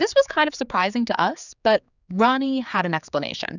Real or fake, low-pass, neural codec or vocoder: fake; 7.2 kHz; codec, 16 kHz, 4 kbps, X-Codec, HuBERT features, trained on general audio